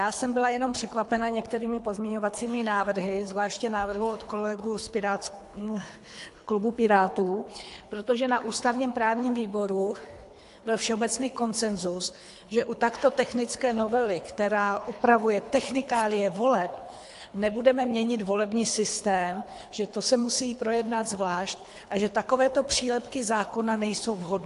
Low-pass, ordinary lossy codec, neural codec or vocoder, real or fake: 10.8 kHz; AAC, 64 kbps; codec, 24 kHz, 3 kbps, HILCodec; fake